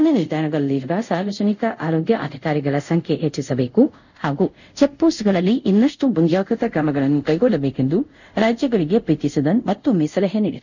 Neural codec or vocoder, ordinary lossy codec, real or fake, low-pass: codec, 24 kHz, 0.5 kbps, DualCodec; none; fake; 7.2 kHz